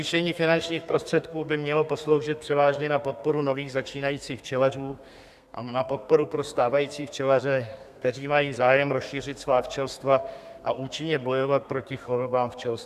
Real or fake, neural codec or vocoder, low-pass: fake; codec, 32 kHz, 1.9 kbps, SNAC; 14.4 kHz